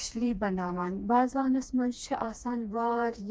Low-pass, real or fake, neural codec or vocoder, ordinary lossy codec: none; fake; codec, 16 kHz, 2 kbps, FreqCodec, smaller model; none